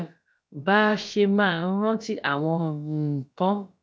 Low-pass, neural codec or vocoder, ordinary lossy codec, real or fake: none; codec, 16 kHz, about 1 kbps, DyCAST, with the encoder's durations; none; fake